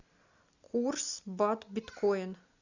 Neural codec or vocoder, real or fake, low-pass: none; real; 7.2 kHz